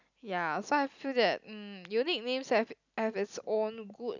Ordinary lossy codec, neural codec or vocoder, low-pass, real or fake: none; none; 7.2 kHz; real